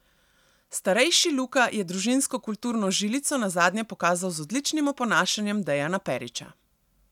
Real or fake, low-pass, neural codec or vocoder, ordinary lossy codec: real; 19.8 kHz; none; none